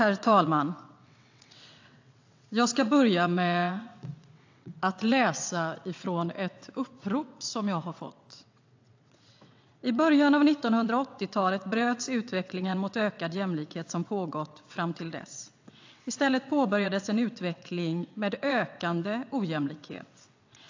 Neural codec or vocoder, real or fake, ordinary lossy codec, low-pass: vocoder, 22.05 kHz, 80 mel bands, WaveNeXt; fake; AAC, 48 kbps; 7.2 kHz